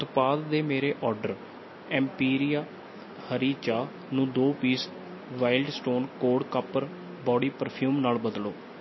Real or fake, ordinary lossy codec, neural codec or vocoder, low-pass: real; MP3, 24 kbps; none; 7.2 kHz